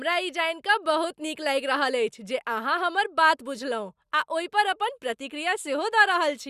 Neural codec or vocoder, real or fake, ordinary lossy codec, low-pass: none; real; Opus, 64 kbps; 14.4 kHz